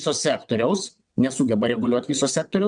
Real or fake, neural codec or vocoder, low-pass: fake; vocoder, 22.05 kHz, 80 mel bands, WaveNeXt; 9.9 kHz